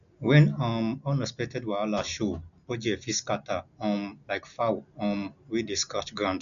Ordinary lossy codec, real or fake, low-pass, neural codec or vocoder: none; real; 7.2 kHz; none